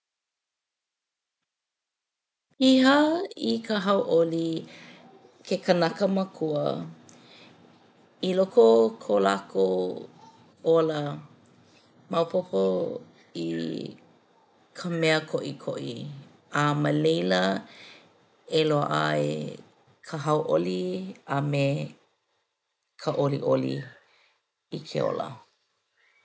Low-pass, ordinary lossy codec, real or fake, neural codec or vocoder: none; none; real; none